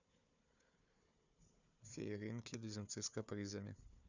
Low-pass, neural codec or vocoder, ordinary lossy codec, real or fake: 7.2 kHz; codec, 16 kHz, 4 kbps, FunCodec, trained on Chinese and English, 50 frames a second; none; fake